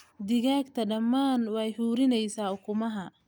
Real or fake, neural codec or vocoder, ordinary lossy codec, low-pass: real; none; none; none